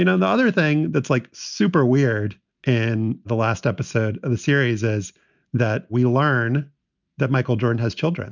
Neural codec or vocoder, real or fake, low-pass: none; real; 7.2 kHz